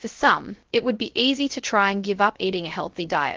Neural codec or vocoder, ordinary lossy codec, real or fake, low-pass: codec, 16 kHz, 0.3 kbps, FocalCodec; Opus, 16 kbps; fake; 7.2 kHz